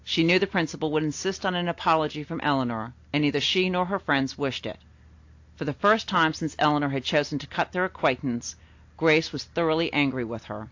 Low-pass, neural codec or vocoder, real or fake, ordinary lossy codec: 7.2 kHz; none; real; AAC, 48 kbps